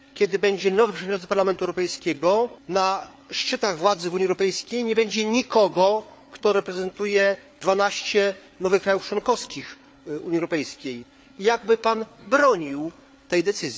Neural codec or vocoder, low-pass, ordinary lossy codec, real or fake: codec, 16 kHz, 4 kbps, FreqCodec, larger model; none; none; fake